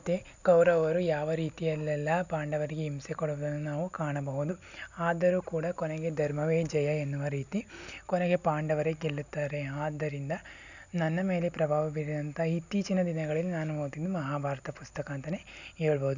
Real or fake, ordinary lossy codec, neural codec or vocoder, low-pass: real; none; none; 7.2 kHz